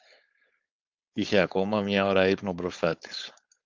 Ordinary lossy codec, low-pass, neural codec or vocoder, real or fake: Opus, 24 kbps; 7.2 kHz; codec, 16 kHz, 4.8 kbps, FACodec; fake